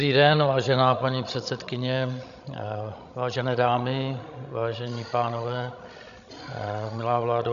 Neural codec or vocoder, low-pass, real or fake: codec, 16 kHz, 16 kbps, FreqCodec, larger model; 7.2 kHz; fake